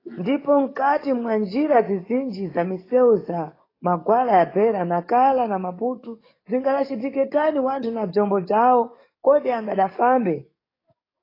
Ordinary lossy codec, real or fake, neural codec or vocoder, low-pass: AAC, 24 kbps; fake; codec, 44.1 kHz, 7.8 kbps, DAC; 5.4 kHz